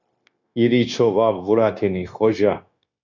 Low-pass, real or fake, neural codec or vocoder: 7.2 kHz; fake; codec, 16 kHz, 0.9 kbps, LongCat-Audio-Codec